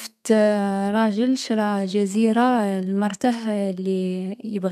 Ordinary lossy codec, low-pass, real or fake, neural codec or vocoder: none; 14.4 kHz; fake; codec, 32 kHz, 1.9 kbps, SNAC